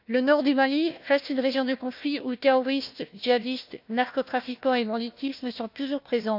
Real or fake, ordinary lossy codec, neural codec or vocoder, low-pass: fake; AAC, 48 kbps; codec, 16 kHz, 1 kbps, FunCodec, trained on Chinese and English, 50 frames a second; 5.4 kHz